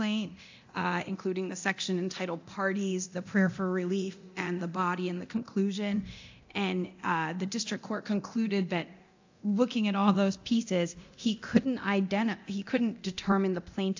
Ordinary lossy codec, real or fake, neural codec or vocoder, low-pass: AAC, 48 kbps; fake; codec, 24 kHz, 0.9 kbps, DualCodec; 7.2 kHz